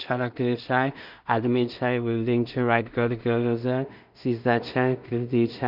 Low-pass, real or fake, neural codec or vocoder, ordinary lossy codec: 5.4 kHz; fake; codec, 16 kHz in and 24 kHz out, 0.4 kbps, LongCat-Audio-Codec, two codebook decoder; none